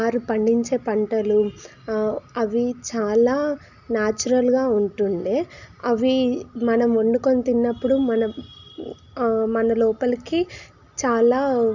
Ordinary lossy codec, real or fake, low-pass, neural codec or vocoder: none; real; 7.2 kHz; none